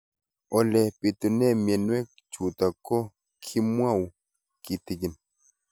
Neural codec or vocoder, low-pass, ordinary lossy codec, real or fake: none; none; none; real